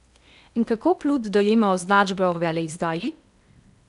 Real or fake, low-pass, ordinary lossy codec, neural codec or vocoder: fake; 10.8 kHz; none; codec, 16 kHz in and 24 kHz out, 0.8 kbps, FocalCodec, streaming, 65536 codes